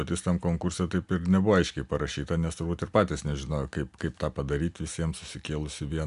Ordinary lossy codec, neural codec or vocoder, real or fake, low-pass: AAC, 96 kbps; none; real; 10.8 kHz